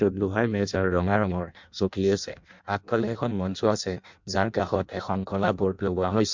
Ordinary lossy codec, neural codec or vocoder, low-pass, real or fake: MP3, 64 kbps; codec, 16 kHz in and 24 kHz out, 0.6 kbps, FireRedTTS-2 codec; 7.2 kHz; fake